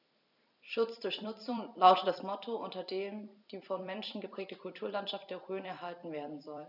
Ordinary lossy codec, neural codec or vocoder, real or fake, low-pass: none; vocoder, 44.1 kHz, 128 mel bands every 256 samples, BigVGAN v2; fake; 5.4 kHz